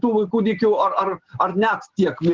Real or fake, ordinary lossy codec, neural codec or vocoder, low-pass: real; Opus, 16 kbps; none; 7.2 kHz